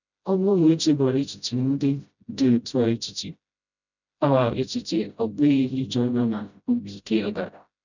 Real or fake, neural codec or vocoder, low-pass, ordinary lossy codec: fake; codec, 16 kHz, 0.5 kbps, FreqCodec, smaller model; 7.2 kHz; none